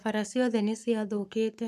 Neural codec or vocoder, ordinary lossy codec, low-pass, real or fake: codec, 44.1 kHz, 7.8 kbps, Pupu-Codec; none; 14.4 kHz; fake